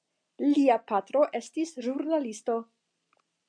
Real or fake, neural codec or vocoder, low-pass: real; none; 9.9 kHz